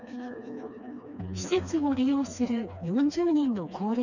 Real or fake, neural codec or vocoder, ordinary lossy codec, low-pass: fake; codec, 16 kHz, 2 kbps, FreqCodec, smaller model; none; 7.2 kHz